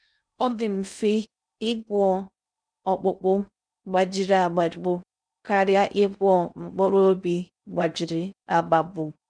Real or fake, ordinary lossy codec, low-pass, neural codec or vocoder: fake; none; 9.9 kHz; codec, 16 kHz in and 24 kHz out, 0.6 kbps, FocalCodec, streaming, 2048 codes